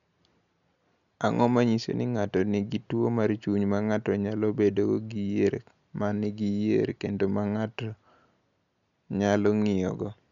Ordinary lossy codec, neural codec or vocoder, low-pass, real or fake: none; none; 7.2 kHz; real